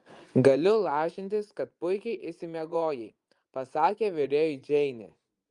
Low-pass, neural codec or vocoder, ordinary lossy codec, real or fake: 10.8 kHz; vocoder, 24 kHz, 100 mel bands, Vocos; Opus, 32 kbps; fake